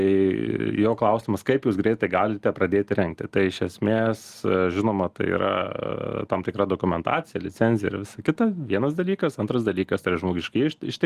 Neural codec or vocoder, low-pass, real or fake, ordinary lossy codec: none; 14.4 kHz; real; Opus, 24 kbps